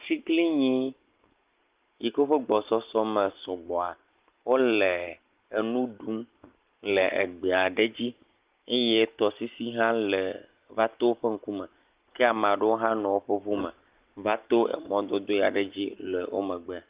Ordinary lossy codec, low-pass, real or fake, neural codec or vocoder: Opus, 32 kbps; 3.6 kHz; real; none